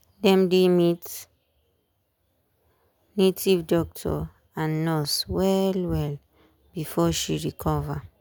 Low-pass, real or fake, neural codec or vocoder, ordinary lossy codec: none; real; none; none